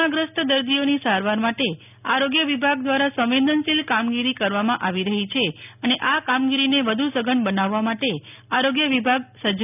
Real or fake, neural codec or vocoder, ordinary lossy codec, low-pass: real; none; none; 3.6 kHz